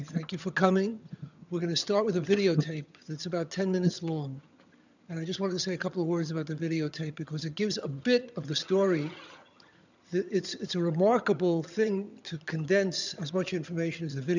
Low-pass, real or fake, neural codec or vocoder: 7.2 kHz; fake; vocoder, 22.05 kHz, 80 mel bands, HiFi-GAN